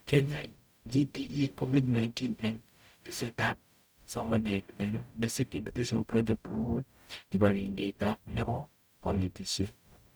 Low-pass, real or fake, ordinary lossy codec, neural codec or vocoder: none; fake; none; codec, 44.1 kHz, 0.9 kbps, DAC